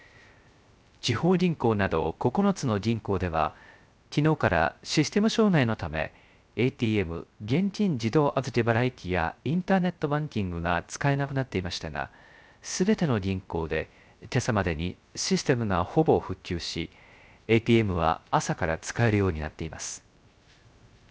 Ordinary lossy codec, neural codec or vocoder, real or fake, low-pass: none; codec, 16 kHz, 0.3 kbps, FocalCodec; fake; none